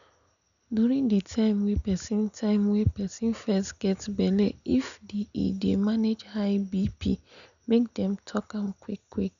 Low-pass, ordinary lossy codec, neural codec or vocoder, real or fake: 7.2 kHz; none; none; real